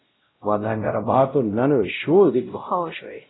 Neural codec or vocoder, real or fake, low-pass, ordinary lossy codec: codec, 16 kHz, 0.5 kbps, X-Codec, WavLM features, trained on Multilingual LibriSpeech; fake; 7.2 kHz; AAC, 16 kbps